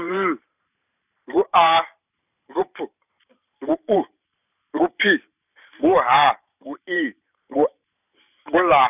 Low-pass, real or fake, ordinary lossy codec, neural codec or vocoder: 3.6 kHz; fake; none; vocoder, 44.1 kHz, 128 mel bands every 512 samples, BigVGAN v2